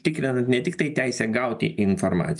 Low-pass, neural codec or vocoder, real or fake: 10.8 kHz; none; real